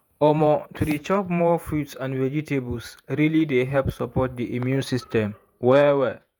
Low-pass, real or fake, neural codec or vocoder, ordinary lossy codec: none; fake; vocoder, 48 kHz, 128 mel bands, Vocos; none